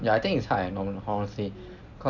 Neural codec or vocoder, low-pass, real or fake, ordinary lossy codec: none; 7.2 kHz; real; none